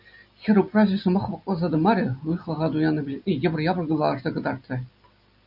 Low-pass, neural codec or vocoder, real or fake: 5.4 kHz; none; real